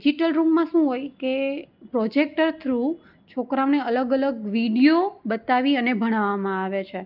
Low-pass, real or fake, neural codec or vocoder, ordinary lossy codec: 5.4 kHz; real; none; Opus, 24 kbps